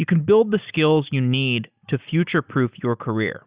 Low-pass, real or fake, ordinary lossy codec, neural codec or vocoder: 3.6 kHz; real; Opus, 64 kbps; none